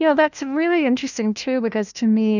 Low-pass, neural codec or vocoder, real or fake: 7.2 kHz; codec, 16 kHz, 1 kbps, FunCodec, trained on LibriTTS, 50 frames a second; fake